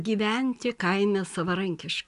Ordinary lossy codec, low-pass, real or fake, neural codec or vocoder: AAC, 96 kbps; 10.8 kHz; real; none